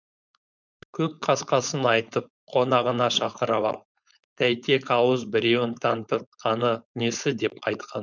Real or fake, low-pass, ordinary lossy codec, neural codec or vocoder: fake; 7.2 kHz; none; codec, 16 kHz, 4.8 kbps, FACodec